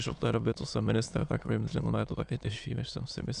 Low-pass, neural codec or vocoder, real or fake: 9.9 kHz; autoencoder, 22.05 kHz, a latent of 192 numbers a frame, VITS, trained on many speakers; fake